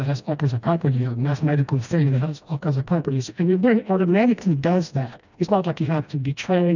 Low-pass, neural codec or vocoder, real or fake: 7.2 kHz; codec, 16 kHz, 1 kbps, FreqCodec, smaller model; fake